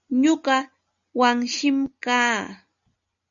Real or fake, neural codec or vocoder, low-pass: real; none; 7.2 kHz